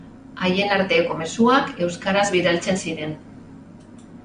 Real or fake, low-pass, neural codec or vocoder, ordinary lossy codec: real; 9.9 kHz; none; AAC, 96 kbps